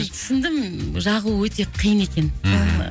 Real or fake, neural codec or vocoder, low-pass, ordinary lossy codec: real; none; none; none